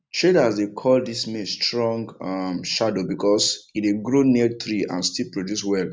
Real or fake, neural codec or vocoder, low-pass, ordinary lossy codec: real; none; none; none